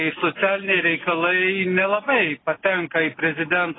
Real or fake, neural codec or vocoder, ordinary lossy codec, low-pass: real; none; AAC, 16 kbps; 7.2 kHz